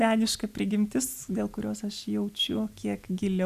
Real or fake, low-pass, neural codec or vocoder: real; 14.4 kHz; none